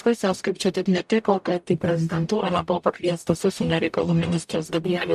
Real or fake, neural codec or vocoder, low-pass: fake; codec, 44.1 kHz, 0.9 kbps, DAC; 14.4 kHz